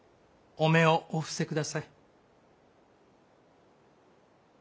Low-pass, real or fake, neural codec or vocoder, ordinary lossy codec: none; real; none; none